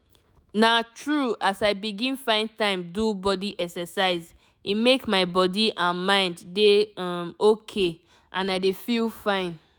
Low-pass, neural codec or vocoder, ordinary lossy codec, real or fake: none; autoencoder, 48 kHz, 128 numbers a frame, DAC-VAE, trained on Japanese speech; none; fake